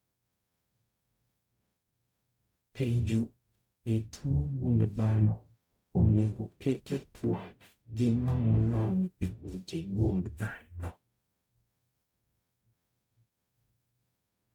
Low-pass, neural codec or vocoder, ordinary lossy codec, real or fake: 19.8 kHz; codec, 44.1 kHz, 0.9 kbps, DAC; MP3, 96 kbps; fake